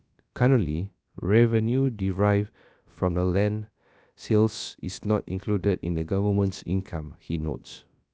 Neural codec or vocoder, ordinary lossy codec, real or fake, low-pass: codec, 16 kHz, about 1 kbps, DyCAST, with the encoder's durations; none; fake; none